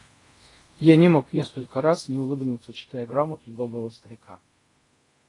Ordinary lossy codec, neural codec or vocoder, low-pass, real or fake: AAC, 32 kbps; codec, 24 kHz, 0.5 kbps, DualCodec; 10.8 kHz; fake